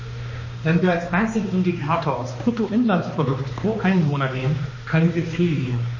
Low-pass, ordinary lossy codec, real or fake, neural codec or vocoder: 7.2 kHz; MP3, 32 kbps; fake; codec, 16 kHz, 2 kbps, X-Codec, HuBERT features, trained on balanced general audio